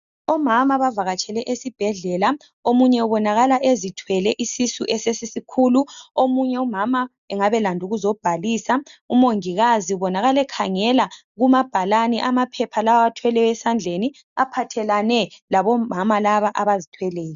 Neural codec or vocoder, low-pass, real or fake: none; 7.2 kHz; real